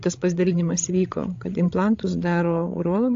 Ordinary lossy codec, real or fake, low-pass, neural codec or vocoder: AAC, 48 kbps; fake; 7.2 kHz; codec, 16 kHz, 16 kbps, FreqCodec, larger model